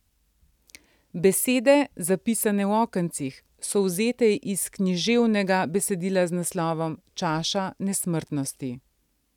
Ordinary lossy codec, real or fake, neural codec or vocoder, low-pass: none; real; none; 19.8 kHz